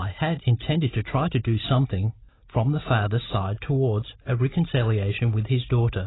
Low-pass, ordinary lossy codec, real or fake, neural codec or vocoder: 7.2 kHz; AAC, 16 kbps; real; none